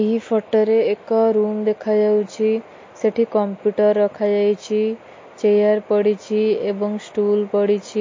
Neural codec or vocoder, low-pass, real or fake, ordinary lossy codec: none; 7.2 kHz; real; MP3, 32 kbps